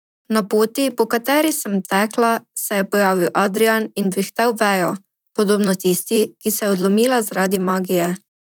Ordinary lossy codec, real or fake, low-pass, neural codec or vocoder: none; fake; none; vocoder, 44.1 kHz, 128 mel bands, Pupu-Vocoder